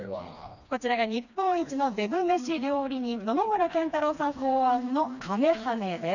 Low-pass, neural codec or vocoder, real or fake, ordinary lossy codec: 7.2 kHz; codec, 16 kHz, 2 kbps, FreqCodec, smaller model; fake; none